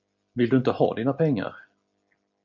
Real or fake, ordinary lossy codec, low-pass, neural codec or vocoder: real; MP3, 48 kbps; 7.2 kHz; none